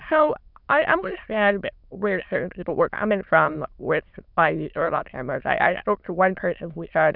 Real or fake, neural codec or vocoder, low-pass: fake; autoencoder, 22.05 kHz, a latent of 192 numbers a frame, VITS, trained on many speakers; 5.4 kHz